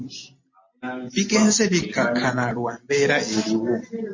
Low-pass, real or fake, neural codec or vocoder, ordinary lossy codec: 7.2 kHz; real; none; MP3, 32 kbps